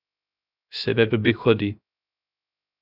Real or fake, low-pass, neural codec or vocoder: fake; 5.4 kHz; codec, 16 kHz, 0.3 kbps, FocalCodec